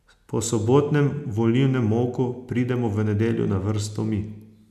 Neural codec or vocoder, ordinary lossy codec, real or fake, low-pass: none; none; real; 14.4 kHz